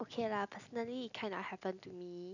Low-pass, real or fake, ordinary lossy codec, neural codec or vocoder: 7.2 kHz; real; none; none